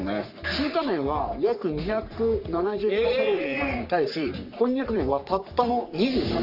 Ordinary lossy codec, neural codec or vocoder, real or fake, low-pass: none; codec, 44.1 kHz, 3.4 kbps, Pupu-Codec; fake; 5.4 kHz